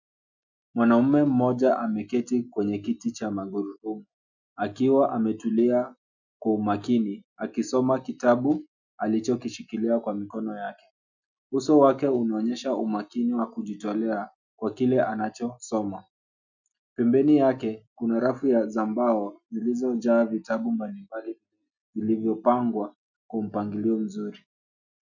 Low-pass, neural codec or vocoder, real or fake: 7.2 kHz; none; real